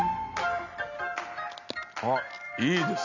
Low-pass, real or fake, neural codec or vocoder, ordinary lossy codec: 7.2 kHz; real; none; none